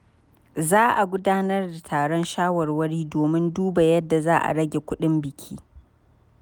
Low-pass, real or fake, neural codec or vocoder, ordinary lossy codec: none; real; none; none